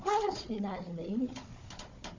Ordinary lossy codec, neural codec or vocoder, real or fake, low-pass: MP3, 48 kbps; codec, 16 kHz, 4 kbps, FunCodec, trained on Chinese and English, 50 frames a second; fake; 7.2 kHz